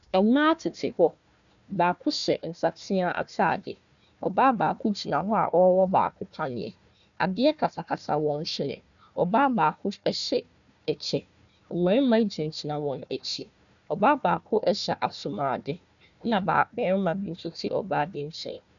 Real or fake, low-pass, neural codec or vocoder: fake; 7.2 kHz; codec, 16 kHz, 1 kbps, FunCodec, trained on Chinese and English, 50 frames a second